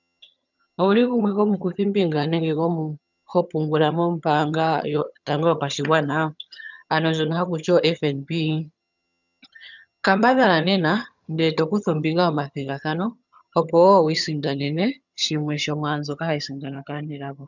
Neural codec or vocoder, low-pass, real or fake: vocoder, 22.05 kHz, 80 mel bands, HiFi-GAN; 7.2 kHz; fake